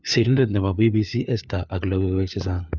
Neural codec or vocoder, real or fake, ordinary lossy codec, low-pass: codec, 16 kHz, 4 kbps, FunCodec, trained on LibriTTS, 50 frames a second; fake; none; 7.2 kHz